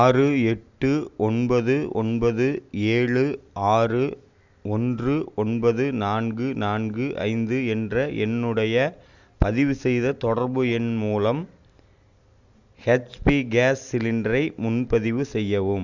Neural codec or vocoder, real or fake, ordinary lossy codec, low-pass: none; real; Opus, 64 kbps; 7.2 kHz